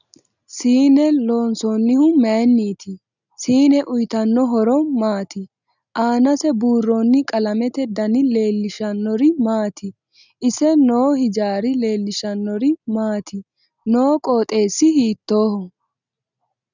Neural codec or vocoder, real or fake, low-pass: none; real; 7.2 kHz